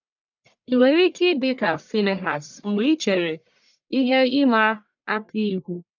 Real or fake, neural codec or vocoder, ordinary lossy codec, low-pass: fake; codec, 44.1 kHz, 1.7 kbps, Pupu-Codec; none; 7.2 kHz